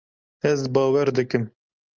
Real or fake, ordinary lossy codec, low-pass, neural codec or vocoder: real; Opus, 32 kbps; 7.2 kHz; none